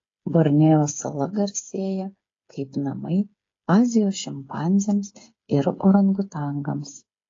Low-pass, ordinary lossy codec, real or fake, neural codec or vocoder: 7.2 kHz; AAC, 32 kbps; fake; codec, 16 kHz, 8 kbps, FreqCodec, smaller model